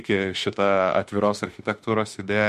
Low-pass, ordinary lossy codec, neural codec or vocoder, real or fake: 14.4 kHz; MP3, 64 kbps; autoencoder, 48 kHz, 32 numbers a frame, DAC-VAE, trained on Japanese speech; fake